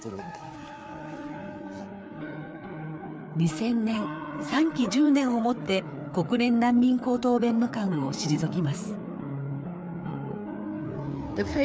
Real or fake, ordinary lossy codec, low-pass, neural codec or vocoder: fake; none; none; codec, 16 kHz, 4 kbps, FreqCodec, larger model